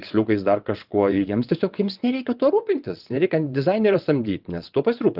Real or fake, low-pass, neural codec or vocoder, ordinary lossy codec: fake; 5.4 kHz; vocoder, 22.05 kHz, 80 mel bands, WaveNeXt; Opus, 32 kbps